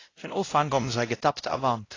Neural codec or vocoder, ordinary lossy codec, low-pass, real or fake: codec, 16 kHz, 0.5 kbps, X-Codec, WavLM features, trained on Multilingual LibriSpeech; AAC, 32 kbps; 7.2 kHz; fake